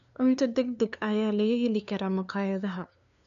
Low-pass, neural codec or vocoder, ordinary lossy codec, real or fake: 7.2 kHz; codec, 16 kHz, 2 kbps, FunCodec, trained on LibriTTS, 25 frames a second; none; fake